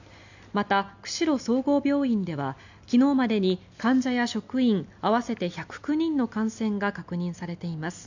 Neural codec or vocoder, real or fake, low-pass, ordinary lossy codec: none; real; 7.2 kHz; none